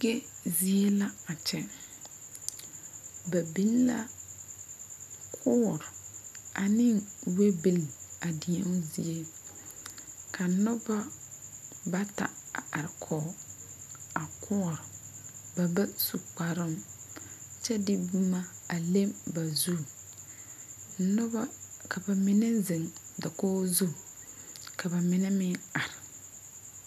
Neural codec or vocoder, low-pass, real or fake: none; 14.4 kHz; real